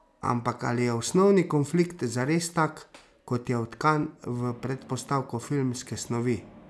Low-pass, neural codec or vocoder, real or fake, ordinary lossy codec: none; none; real; none